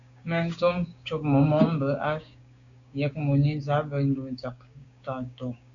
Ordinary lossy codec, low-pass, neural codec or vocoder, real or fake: MP3, 96 kbps; 7.2 kHz; codec, 16 kHz, 6 kbps, DAC; fake